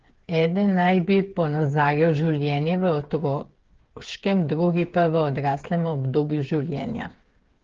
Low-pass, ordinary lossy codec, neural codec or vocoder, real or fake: 7.2 kHz; Opus, 16 kbps; codec, 16 kHz, 8 kbps, FreqCodec, smaller model; fake